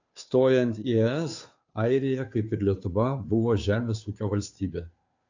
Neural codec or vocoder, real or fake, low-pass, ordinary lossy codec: codec, 16 kHz, 2 kbps, FunCodec, trained on Chinese and English, 25 frames a second; fake; 7.2 kHz; AAC, 48 kbps